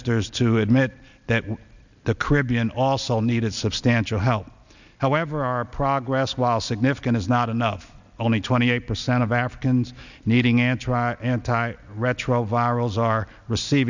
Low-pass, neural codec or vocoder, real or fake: 7.2 kHz; none; real